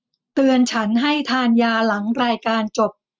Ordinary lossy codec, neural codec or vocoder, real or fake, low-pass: none; none; real; none